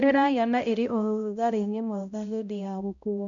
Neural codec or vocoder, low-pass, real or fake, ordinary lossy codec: codec, 16 kHz, 0.5 kbps, X-Codec, HuBERT features, trained on balanced general audio; 7.2 kHz; fake; none